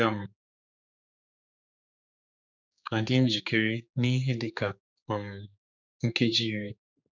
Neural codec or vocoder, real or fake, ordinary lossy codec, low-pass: codec, 16 kHz, 4 kbps, X-Codec, HuBERT features, trained on general audio; fake; none; 7.2 kHz